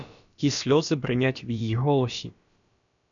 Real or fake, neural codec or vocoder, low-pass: fake; codec, 16 kHz, about 1 kbps, DyCAST, with the encoder's durations; 7.2 kHz